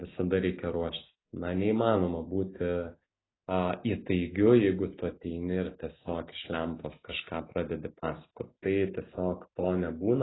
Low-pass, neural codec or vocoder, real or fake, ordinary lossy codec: 7.2 kHz; none; real; AAC, 16 kbps